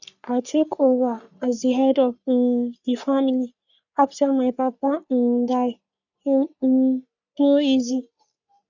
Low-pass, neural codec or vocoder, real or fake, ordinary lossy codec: 7.2 kHz; codec, 44.1 kHz, 3.4 kbps, Pupu-Codec; fake; none